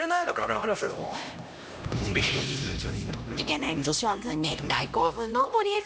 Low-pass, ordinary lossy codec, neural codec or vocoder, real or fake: none; none; codec, 16 kHz, 1 kbps, X-Codec, HuBERT features, trained on LibriSpeech; fake